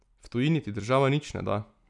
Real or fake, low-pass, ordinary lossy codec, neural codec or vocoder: real; 10.8 kHz; none; none